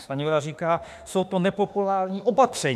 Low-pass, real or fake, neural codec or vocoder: 14.4 kHz; fake; autoencoder, 48 kHz, 32 numbers a frame, DAC-VAE, trained on Japanese speech